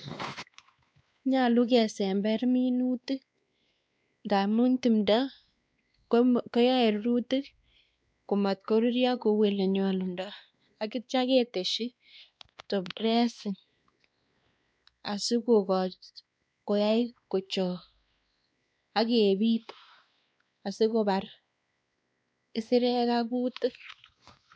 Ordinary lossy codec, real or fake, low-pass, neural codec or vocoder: none; fake; none; codec, 16 kHz, 2 kbps, X-Codec, WavLM features, trained on Multilingual LibriSpeech